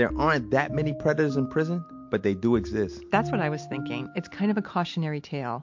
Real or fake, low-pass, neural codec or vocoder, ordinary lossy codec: real; 7.2 kHz; none; MP3, 48 kbps